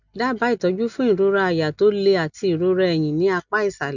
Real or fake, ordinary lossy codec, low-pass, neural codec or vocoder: real; none; 7.2 kHz; none